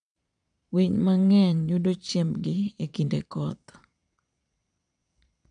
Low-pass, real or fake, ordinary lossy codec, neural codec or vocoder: 9.9 kHz; fake; none; vocoder, 22.05 kHz, 80 mel bands, Vocos